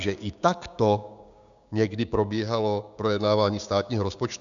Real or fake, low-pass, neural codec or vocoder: fake; 7.2 kHz; codec, 16 kHz, 6 kbps, DAC